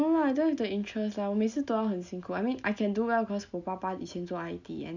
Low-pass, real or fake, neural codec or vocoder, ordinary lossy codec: 7.2 kHz; real; none; none